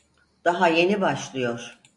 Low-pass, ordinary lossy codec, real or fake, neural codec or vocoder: 10.8 kHz; AAC, 64 kbps; real; none